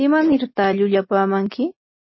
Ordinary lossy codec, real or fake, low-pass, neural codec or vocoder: MP3, 24 kbps; real; 7.2 kHz; none